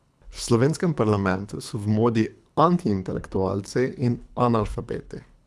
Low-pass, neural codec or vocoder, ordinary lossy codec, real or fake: none; codec, 24 kHz, 3 kbps, HILCodec; none; fake